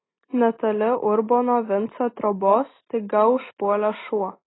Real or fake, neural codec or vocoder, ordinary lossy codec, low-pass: real; none; AAC, 16 kbps; 7.2 kHz